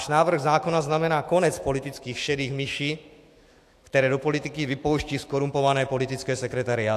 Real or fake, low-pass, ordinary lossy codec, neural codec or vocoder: fake; 14.4 kHz; AAC, 64 kbps; autoencoder, 48 kHz, 128 numbers a frame, DAC-VAE, trained on Japanese speech